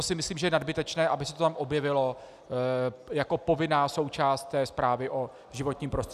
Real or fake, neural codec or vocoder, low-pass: real; none; 14.4 kHz